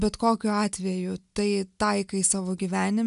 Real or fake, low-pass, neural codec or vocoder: real; 10.8 kHz; none